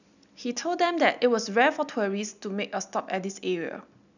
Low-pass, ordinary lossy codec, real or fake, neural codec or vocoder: 7.2 kHz; none; real; none